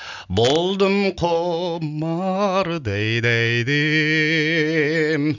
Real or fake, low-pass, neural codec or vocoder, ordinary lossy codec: real; 7.2 kHz; none; none